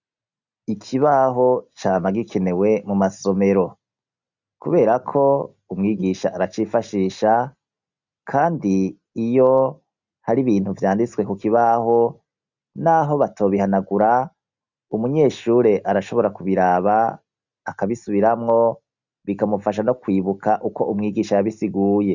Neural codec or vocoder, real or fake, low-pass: none; real; 7.2 kHz